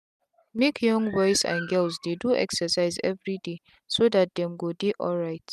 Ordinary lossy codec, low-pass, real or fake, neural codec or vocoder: none; 14.4 kHz; real; none